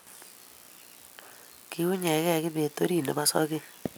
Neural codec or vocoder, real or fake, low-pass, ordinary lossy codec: none; real; none; none